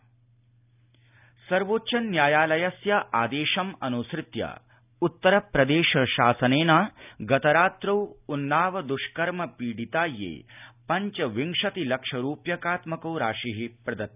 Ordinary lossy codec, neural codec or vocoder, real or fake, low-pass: none; none; real; 3.6 kHz